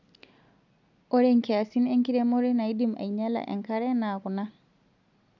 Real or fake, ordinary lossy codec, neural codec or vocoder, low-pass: real; none; none; 7.2 kHz